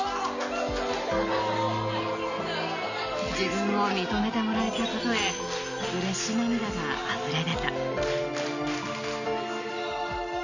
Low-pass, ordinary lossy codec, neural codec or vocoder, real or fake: 7.2 kHz; none; none; real